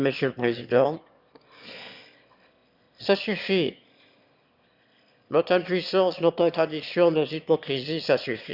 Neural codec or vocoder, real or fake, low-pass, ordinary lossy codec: autoencoder, 22.05 kHz, a latent of 192 numbers a frame, VITS, trained on one speaker; fake; 5.4 kHz; Opus, 64 kbps